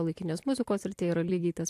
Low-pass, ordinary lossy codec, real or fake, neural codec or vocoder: 14.4 kHz; AAC, 64 kbps; fake; vocoder, 44.1 kHz, 128 mel bands every 256 samples, BigVGAN v2